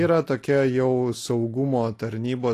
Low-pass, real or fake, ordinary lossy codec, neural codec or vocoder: 14.4 kHz; real; AAC, 48 kbps; none